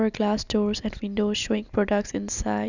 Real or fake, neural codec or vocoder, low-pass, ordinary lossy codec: real; none; 7.2 kHz; none